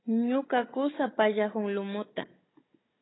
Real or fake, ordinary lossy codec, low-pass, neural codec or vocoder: fake; AAC, 16 kbps; 7.2 kHz; codec, 16 kHz, 16 kbps, FunCodec, trained on Chinese and English, 50 frames a second